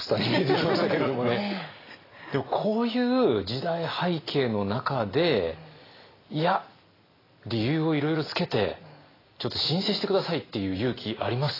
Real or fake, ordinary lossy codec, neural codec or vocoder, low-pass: real; AAC, 24 kbps; none; 5.4 kHz